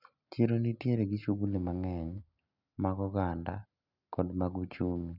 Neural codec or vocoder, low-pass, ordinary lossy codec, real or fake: none; 5.4 kHz; none; real